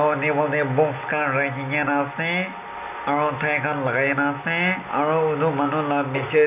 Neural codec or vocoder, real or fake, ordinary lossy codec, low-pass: none; real; none; 3.6 kHz